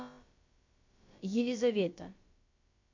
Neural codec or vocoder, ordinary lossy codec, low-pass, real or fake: codec, 16 kHz, about 1 kbps, DyCAST, with the encoder's durations; MP3, 48 kbps; 7.2 kHz; fake